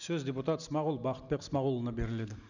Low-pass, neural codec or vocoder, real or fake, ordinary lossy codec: 7.2 kHz; none; real; none